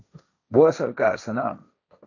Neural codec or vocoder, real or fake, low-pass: codec, 16 kHz, 1.1 kbps, Voila-Tokenizer; fake; 7.2 kHz